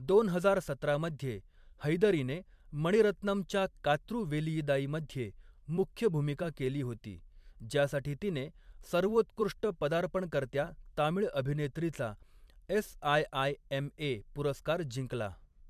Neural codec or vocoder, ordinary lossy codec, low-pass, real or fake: vocoder, 44.1 kHz, 128 mel bands every 256 samples, BigVGAN v2; MP3, 96 kbps; 14.4 kHz; fake